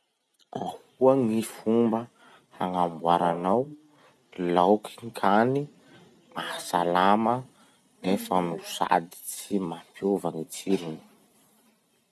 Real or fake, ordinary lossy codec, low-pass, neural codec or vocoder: real; none; none; none